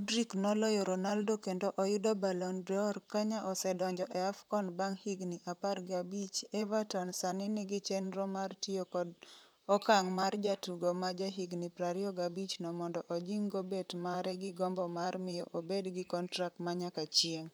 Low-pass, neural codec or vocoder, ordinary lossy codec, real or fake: none; vocoder, 44.1 kHz, 128 mel bands, Pupu-Vocoder; none; fake